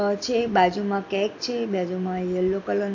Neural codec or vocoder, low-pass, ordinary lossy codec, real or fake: none; 7.2 kHz; AAC, 48 kbps; real